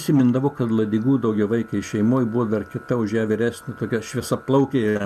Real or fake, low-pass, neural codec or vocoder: real; 14.4 kHz; none